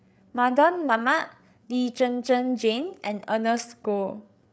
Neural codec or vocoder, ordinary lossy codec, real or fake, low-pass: codec, 16 kHz, 8 kbps, FreqCodec, larger model; none; fake; none